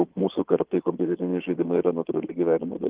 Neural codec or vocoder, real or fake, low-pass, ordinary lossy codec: vocoder, 22.05 kHz, 80 mel bands, Vocos; fake; 3.6 kHz; Opus, 16 kbps